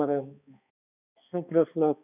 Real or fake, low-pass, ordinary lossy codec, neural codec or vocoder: fake; 3.6 kHz; none; codec, 16 kHz, 2 kbps, X-Codec, HuBERT features, trained on balanced general audio